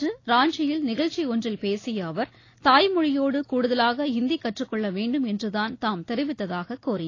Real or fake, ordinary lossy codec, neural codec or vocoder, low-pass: real; AAC, 32 kbps; none; 7.2 kHz